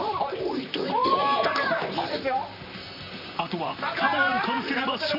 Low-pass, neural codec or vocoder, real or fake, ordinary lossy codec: 5.4 kHz; vocoder, 44.1 kHz, 128 mel bands, Pupu-Vocoder; fake; none